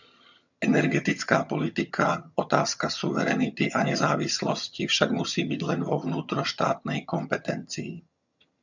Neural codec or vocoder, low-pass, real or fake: vocoder, 22.05 kHz, 80 mel bands, HiFi-GAN; 7.2 kHz; fake